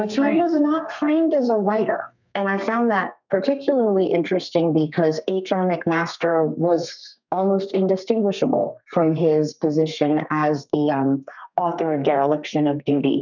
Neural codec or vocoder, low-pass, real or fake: codec, 32 kHz, 1.9 kbps, SNAC; 7.2 kHz; fake